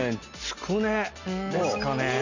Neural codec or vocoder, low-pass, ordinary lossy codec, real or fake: none; 7.2 kHz; none; real